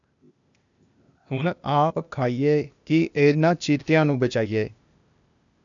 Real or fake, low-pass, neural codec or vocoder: fake; 7.2 kHz; codec, 16 kHz, 0.8 kbps, ZipCodec